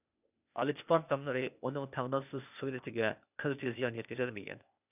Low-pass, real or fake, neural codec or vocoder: 3.6 kHz; fake; codec, 16 kHz, 0.8 kbps, ZipCodec